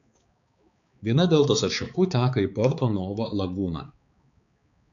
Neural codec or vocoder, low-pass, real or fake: codec, 16 kHz, 4 kbps, X-Codec, HuBERT features, trained on balanced general audio; 7.2 kHz; fake